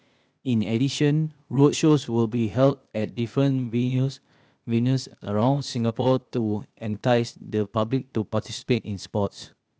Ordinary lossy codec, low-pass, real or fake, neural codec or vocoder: none; none; fake; codec, 16 kHz, 0.8 kbps, ZipCodec